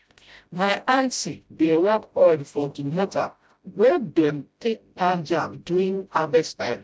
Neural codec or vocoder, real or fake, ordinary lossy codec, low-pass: codec, 16 kHz, 0.5 kbps, FreqCodec, smaller model; fake; none; none